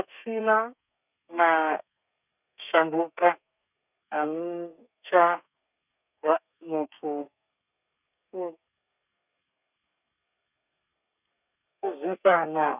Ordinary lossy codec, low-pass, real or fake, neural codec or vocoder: none; 3.6 kHz; fake; codec, 32 kHz, 1.9 kbps, SNAC